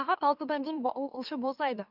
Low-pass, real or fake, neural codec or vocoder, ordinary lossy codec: 5.4 kHz; fake; autoencoder, 44.1 kHz, a latent of 192 numbers a frame, MeloTTS; none